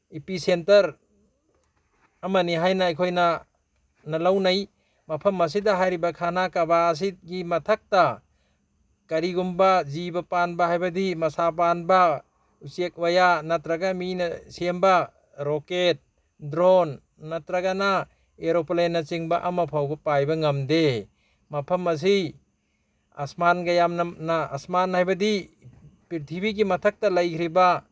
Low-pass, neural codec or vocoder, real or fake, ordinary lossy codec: none; none; real; none